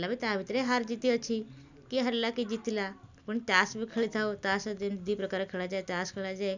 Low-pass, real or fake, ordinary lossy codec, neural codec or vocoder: 7.2 kHz; real; none; none